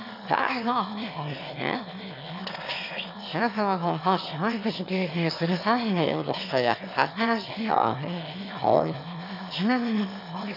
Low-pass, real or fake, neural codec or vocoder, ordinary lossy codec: 5.4 kHz; fake; autoencoder, 22.05 kHz, a latent of 192 numbers a frame, VITS, trained on one speaker; none